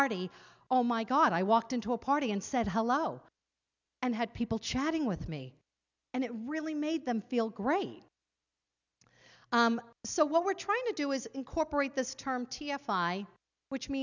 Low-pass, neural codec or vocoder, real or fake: 7.2 kHz; none; real